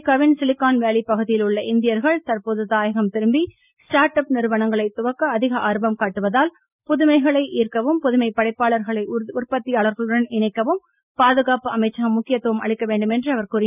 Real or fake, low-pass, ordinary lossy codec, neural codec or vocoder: real; 3.6 kHz; none; none